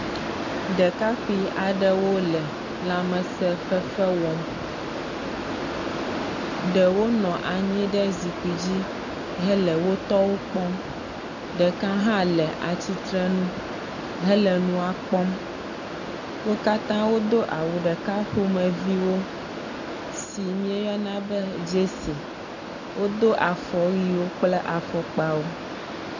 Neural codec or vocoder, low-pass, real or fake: none; 7.2 kHz; real